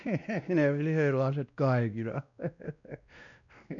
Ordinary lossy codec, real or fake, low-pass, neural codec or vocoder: none; fake; 7.2 kHz; codec, 16 kHz, 1 kbps, X-Codec, WavLM features, trained on Multilingual LibriSpeech